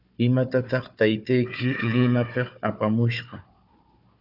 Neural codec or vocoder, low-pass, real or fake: codec, 16 kHz, 4 kbps, FunCodec, trained on Chinese and English, 50 frames a second; 5.4 kHz; fake